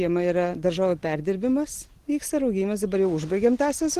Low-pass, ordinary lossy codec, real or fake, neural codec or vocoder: 14.4 kHz; Opus, 16 kbps; real; none